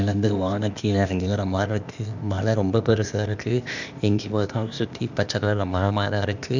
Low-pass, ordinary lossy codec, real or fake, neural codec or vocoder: 7.2 kHz; none; fake; codec, 16 kHz, 0.8 kbps, ZipCodec